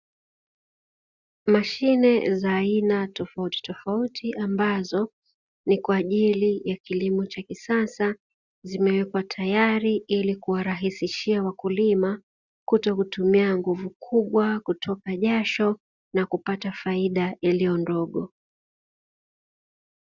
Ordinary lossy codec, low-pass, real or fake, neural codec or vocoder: Opus, 64 kbps; 7.2 kHz; real; none